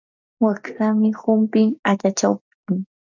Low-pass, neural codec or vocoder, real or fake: 7.2 kHz; none; real